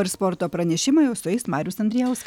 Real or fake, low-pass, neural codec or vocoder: real; 19.8 kHz; none